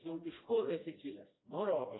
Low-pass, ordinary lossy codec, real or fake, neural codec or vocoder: 7.2 kHz; AAC, 16 kbps; fake; codec, 16 kHz, 1 kbps, FreqCodec, smaller model